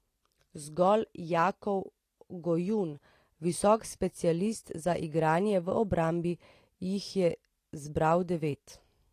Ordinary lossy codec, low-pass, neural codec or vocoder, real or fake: AAC, 48 kbps; 14.4 kHz; none; real